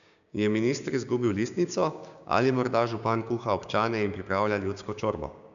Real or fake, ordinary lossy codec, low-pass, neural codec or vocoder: fake; none; 7.2 kHz; codec, 16 kHz, 6 kbps, DAC